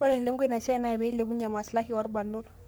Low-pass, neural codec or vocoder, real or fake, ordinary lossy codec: none; codec, 44.1 kHz, 3.4 kbps, Pupu-Codec; fake; none